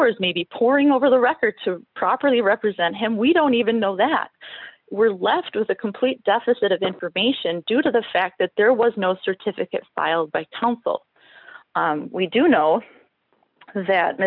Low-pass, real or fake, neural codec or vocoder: 5.4 kHz; real; none